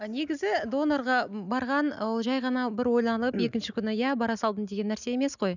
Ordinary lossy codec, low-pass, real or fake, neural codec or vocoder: none; 7.2 kHz; real; none